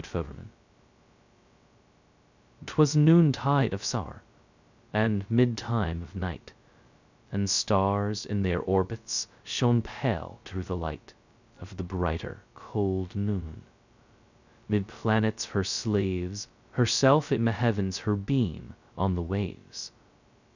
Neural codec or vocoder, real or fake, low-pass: codec, 16 kHz, 0.2 kbps, FocalCodec; fake; 7.2 kHz